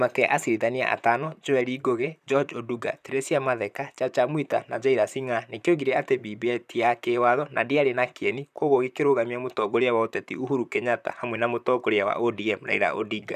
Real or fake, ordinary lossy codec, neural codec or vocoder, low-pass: fake; none; vocoder, 44.1 kHz, 128 mel bands, Pupu-Vocoder; 14.4 kHz